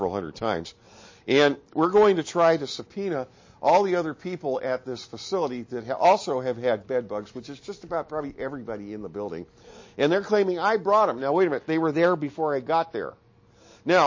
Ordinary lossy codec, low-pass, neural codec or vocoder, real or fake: MP3, 32 kbps; 7.2 kHz; none; real